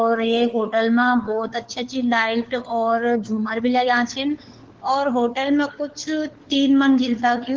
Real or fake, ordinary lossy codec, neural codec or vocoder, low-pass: fake; Opus, 16 kbps; codec, 16 kHz, 4 kbps, FunCodec, trained on LibriTTS, 50 frames a second; 7.2 kHz